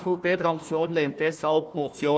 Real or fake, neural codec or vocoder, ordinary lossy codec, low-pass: fake; codec, 16 kHz, 1 kbps, FunCodec, trained on Chinese and English, 50 frames a second; none; none